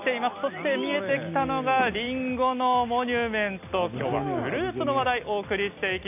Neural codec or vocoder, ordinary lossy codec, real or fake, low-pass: none; AAC, 32 kbps; real; 3.6 kHz